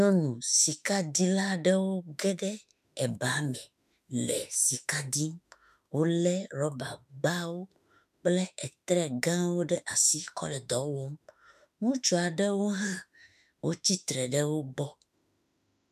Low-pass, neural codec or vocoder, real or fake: 14.4 kHz; autoencoder, 48 kHz, 32 numbers a frame, DAC-VAE, trained on Japanese speech; fake